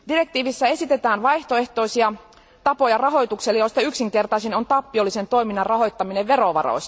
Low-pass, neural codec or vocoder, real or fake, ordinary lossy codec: none; none; real; none